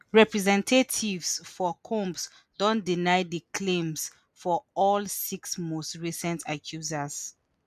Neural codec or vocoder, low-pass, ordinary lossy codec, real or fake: none; 14.4 kHz; none; real